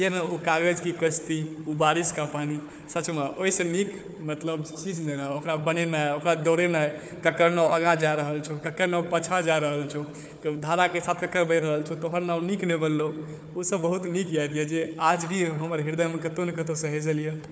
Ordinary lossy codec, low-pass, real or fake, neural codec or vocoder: none; none; fake; codec, 16 kHz, 4 kbps, FunCodec, trained on Chinese and English, 50 frames a second